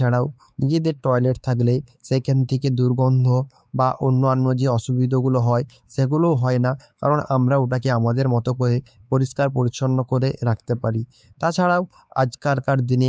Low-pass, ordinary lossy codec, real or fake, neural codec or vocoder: none; none; fake; codec, 16 kHz, 4 kbps, X-Codec, WavLM features, trained on Multilingual LibriSpeech